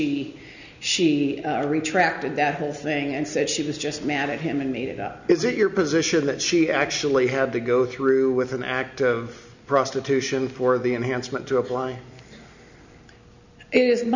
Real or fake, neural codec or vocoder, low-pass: real; none; 7.2 kHz